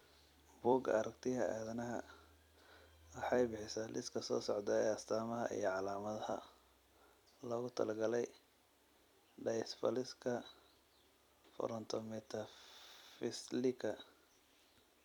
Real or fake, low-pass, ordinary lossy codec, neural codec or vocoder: fake; 19.8 kHz; none; vocoder, 44.1 kHz, 128 mel bands every 256 samples, BigVGAN v2